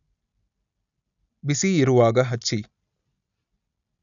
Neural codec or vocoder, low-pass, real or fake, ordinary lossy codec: none; 7.2 kHz; real; none